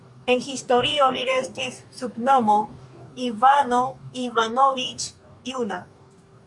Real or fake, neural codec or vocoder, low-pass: fake; codec, 44.1 kHz, 2.6 kbps, DAC; 10.8 kHz